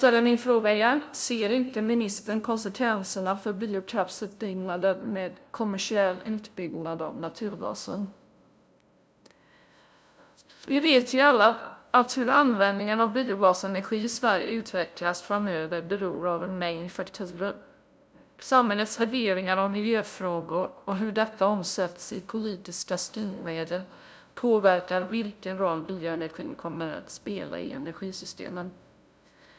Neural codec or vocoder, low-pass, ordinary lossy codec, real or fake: codec, 16 kHz, 0.5 kbps, FunCodec, trained on LibriTTS, 25 frames a second; none; none; fake